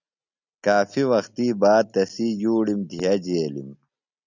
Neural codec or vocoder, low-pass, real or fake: none; 7.2 kHz; real